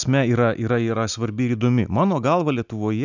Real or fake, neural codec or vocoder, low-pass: real; none; 7.2 kHz